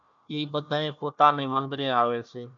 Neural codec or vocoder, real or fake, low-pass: codec, 16 kHz, 1 kbps, FunCodec, trained on Chinese and English, 50 frames a second; fake; 7.2 kHz